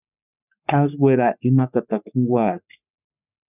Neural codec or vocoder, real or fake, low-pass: codec, 44.1 kHz, 3.4 kbps, Pupu-Codec; fake; 3.6 kHz